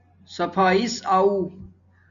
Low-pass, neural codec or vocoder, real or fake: 7.2 kHz; none; real